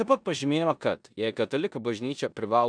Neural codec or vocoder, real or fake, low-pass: codec, 16 kHz in and 24 kHz out, 0.9 kbps, LongCat-Audio-Codec, four codebook decoder; fake; 9.9 kHz